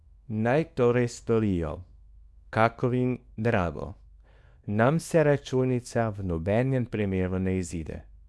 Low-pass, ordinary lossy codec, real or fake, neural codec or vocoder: none; none; fake; codec, 24 kHz, 0.9 kbps, WavTokenizer, small release